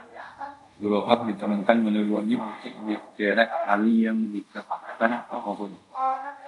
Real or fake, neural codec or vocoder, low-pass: fake; codec, 24 kHz, 0.5 kbps, DualCodec; 10.8 kHz